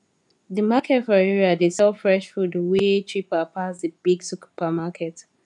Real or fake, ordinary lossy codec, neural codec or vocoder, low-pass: fake; none; vocoder, 24 kHz, 100 mel bands, Vocos; 10.8 kHz